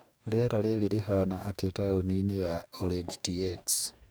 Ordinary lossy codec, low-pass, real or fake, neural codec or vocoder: none; none; fake; codec, 44.1 kHz, 2.6 kbps, DAC